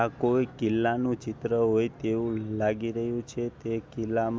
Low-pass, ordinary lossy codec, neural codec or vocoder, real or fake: none; none; none; real